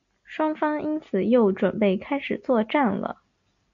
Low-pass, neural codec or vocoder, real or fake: 7.2 kHz; none; real